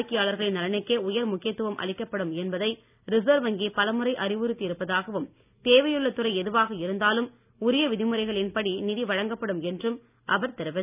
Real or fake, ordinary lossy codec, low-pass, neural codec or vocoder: real; none; 3.6 kHz; none